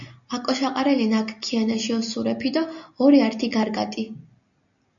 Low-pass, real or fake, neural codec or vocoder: 7.2 kHz; real; none